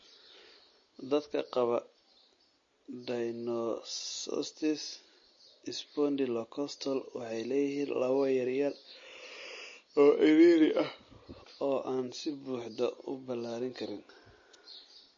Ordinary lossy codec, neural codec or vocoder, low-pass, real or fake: MP3, 32 kbps; none; 7.2 kHz; real